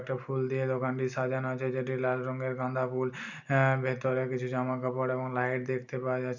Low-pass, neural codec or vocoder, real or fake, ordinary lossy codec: 7.2 kHz; none; real; none